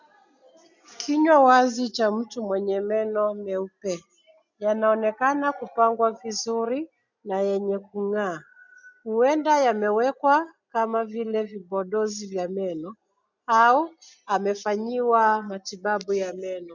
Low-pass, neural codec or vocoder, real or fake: 7.2 kHz; none; real